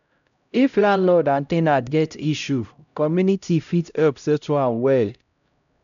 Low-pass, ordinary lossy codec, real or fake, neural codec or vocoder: 7.2 kHz; none; fake; codec, 16 kHz, 0.5 kbps, X-Codec, HuBERT features, trained on LibriSpeech